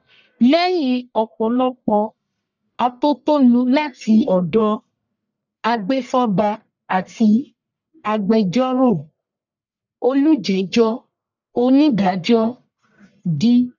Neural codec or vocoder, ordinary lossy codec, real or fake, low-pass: codec, 44.1 kHz, 1.7 kbps, Pupu-Codec; none; fake; 7.2 kHz